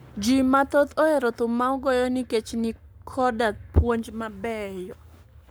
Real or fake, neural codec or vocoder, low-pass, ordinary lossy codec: fake; codec, 44.1 kHz, 7.8 kbps, Pupu-Codec; none; none